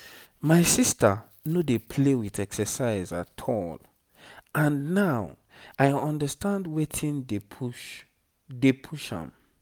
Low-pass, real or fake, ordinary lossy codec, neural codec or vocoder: none; real; none; none